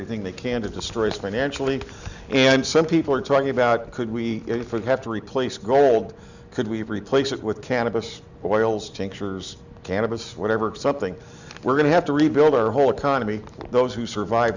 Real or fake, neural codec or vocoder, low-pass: real; none; 7.2 kHz